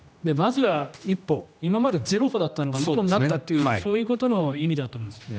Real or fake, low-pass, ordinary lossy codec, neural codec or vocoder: fake; none; none; codec, 16 kHz, 1 kbps, X-Codec, HuBERT features, trained on general audio